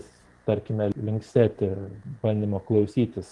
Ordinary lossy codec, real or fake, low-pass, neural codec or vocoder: Opus, 24 kbps; fake; 10.8 kHz; vocoder, 44.1 kHz, 128 mel bands every 512 samples, BigVGAN v2